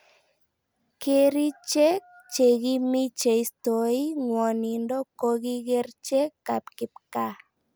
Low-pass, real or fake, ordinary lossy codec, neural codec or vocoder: none; real; none; none